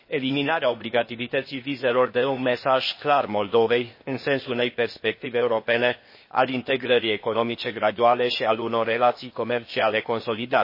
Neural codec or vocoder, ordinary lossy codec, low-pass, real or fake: codec, 16 kHz, 0.8 kbps, ZipCodec; MP3, 24 kbps; 5.4 kHz; fake